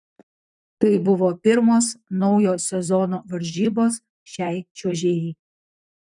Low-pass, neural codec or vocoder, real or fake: 10.8 kHz; vocoder, 44.1 kHz, 128 mel bands, Pupu-Vocoder; fake